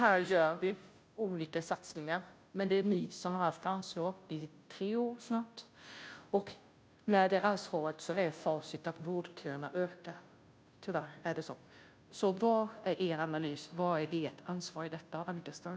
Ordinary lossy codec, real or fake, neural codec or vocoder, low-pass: none; fake; codec, 16 kHz, 0.5 kbps, FunCodec, trained on Chinese and English, 25 frames a second; none